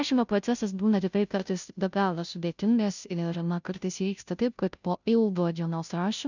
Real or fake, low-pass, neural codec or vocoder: fake; 7.2 kHz; codec, 16 kHz, 0.5 kbps, FunCodec, trained on Chinese and English, 25 frames a second